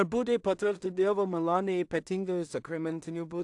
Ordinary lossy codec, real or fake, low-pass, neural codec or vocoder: AAC, 64 kbps; fake; 10.8 kHz; codec, 16 kHz in and 24 kHz out, 0.4 kbps, LongCat-Audio-Codec, two codebook decoder